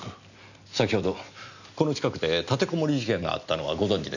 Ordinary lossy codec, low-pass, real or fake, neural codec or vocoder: none; 7.2 kHz; real; none